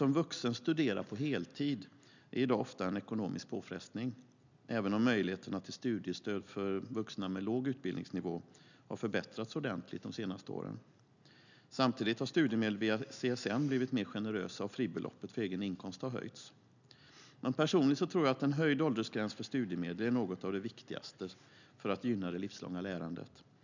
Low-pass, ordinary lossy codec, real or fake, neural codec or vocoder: 7.2 kHz; none; real; none